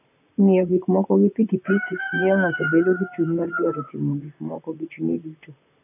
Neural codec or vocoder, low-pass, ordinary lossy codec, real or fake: vocoder, 44.1 kHz, 128 mel bands, Pupu-Vocoder; 3.6 kHz; none; fake